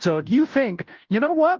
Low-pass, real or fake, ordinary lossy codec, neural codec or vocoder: 7.2 kHz; fake; Opus, 24 kbps; codec, 16 kHz, 0.5 kbps, FunCodec, trained on Chinese and English, 25 frames a second